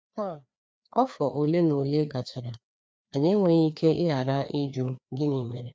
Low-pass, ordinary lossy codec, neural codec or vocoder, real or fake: none; none; codec, 16 kHz, 2 kbps, FreqCodec, larger model; fake